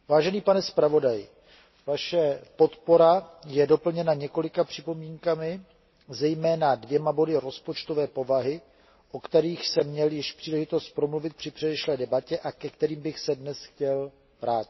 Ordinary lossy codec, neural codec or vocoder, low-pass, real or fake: MP3, 24 kbps; none; 7.2 kHz; real